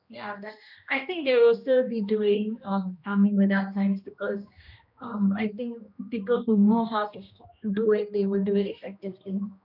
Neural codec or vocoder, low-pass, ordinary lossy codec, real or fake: codec, 16 kHz, 1 kbps, X-Codec, HuBERT features, trained on general audio; 5.4 kHz; none; fake